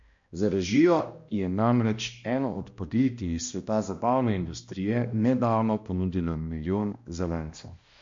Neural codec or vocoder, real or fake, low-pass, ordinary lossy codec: codec, 16 kHz, 1 kbps, X-Codec, HuBERT features, trained on balanced general audio; fake; 7.2 kHz; MP3, 48 kbps